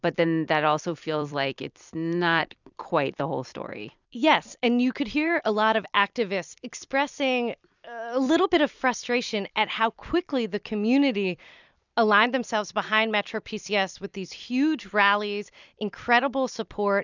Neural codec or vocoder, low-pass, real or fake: vocoder, 44.1 kHz, 128 mel bands every 256 samples, BigVGAN v2; 7.2 kHz; fake